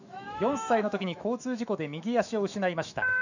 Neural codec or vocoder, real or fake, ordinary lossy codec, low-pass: autoencoder, 48 kHz, 128 numbers a frame, DAC-VAE, trained on Japanese speech; fake; none; 7.2 kHz